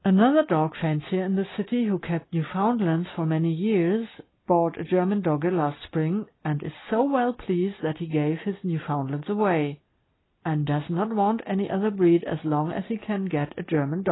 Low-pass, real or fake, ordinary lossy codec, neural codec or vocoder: 7.2 kHz; real; AAC, 16 kbps; none